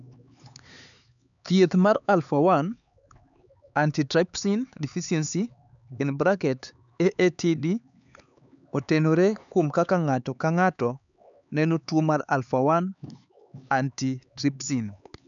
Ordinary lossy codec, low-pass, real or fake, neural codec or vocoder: none; 7.2 kHz; fake; codec, 16 kHz, 4 kbps, X-Codec, HuBERT features, trained on LibriSpeech